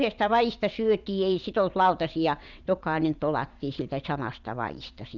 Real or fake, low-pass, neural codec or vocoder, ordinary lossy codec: fake; 7.2 kHz; vocoder, 22.05 kHz, 80 mel bands, WaveNeXt; none